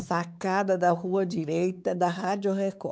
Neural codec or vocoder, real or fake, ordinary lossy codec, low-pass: codec, 16 kHz, 4 kbps, X-Codec, WavLM features, trained on Multilingual LibriSpeech; fake; none; none